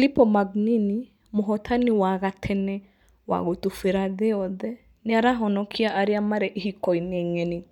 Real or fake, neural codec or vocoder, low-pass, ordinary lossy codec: real; none; 19.8 kHz; none